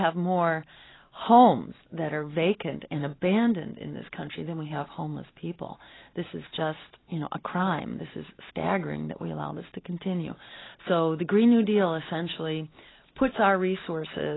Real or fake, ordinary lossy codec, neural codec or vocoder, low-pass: real; AAC, 16 kbps; none; 7.2 kHz